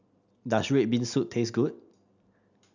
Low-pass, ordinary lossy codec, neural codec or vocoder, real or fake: 7.2 kHz; none; none; real